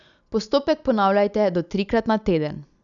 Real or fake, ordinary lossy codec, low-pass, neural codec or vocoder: real; none; 7.2 kHz; none